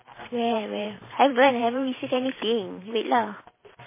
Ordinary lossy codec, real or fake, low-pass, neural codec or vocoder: MP3, 16 kbps; fake; 3.6 kHz; vocoder, 44.1 kHz, 128 mel bands every 512 samples, BigVGAN v2